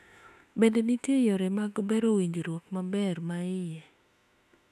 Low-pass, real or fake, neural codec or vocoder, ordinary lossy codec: 14.4 kHz; fake; autoencoder, 48 kHz, 32 numbers a frame, DAC-VAE, trained on Japanese speech; none